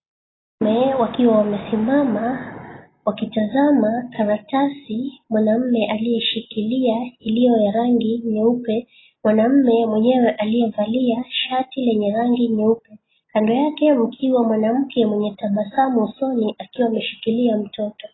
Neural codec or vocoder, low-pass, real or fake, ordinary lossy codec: none; 7.2 kHz; real; AAC, 16 kbps